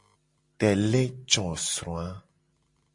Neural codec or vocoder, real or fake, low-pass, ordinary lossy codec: none; real; 10.8 kHz; MP3, 48 kbps